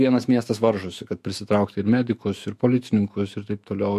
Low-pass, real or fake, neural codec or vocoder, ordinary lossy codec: 14.4 kHz; fake; autoencoder, 48 kHz, 128 numbers a frame, DAC-VAE, trained on Japanese speech; MP3, 64 kbps